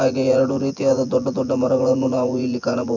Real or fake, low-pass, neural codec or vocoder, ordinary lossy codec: fake; 7.2 kHz; vocoder, 24 kHz, 100 mel bands, Vocos; none